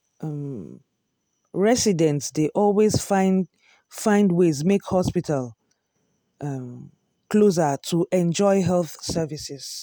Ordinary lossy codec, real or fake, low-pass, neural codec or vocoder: none; real; none; none